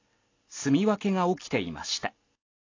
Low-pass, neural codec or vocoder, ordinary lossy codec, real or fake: 7.2 kHz; none; AAC, 32 kbps; real